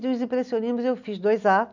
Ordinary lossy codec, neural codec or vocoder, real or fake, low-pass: none; none; real; 7.2 kHz